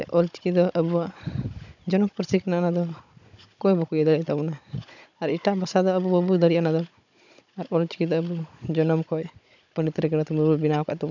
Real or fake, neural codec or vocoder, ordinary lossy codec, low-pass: fake; codec, 16 kHz, 16 kbps, FunCodec, trained on Chinese and English, 50 frames a second; none; 7.2 kHz